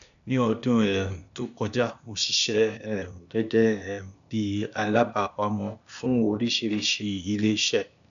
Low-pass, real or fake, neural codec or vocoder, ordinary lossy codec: 7.2 kHz; fake; codec, 16 kHz, 0.8 kbps, ZipCodec; none